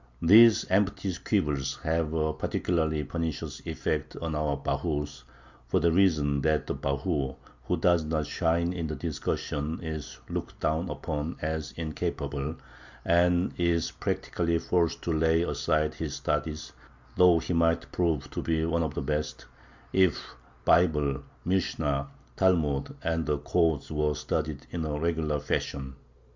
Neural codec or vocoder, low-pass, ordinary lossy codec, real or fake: none; 7.2 kHz; Opus, 64 kbps; real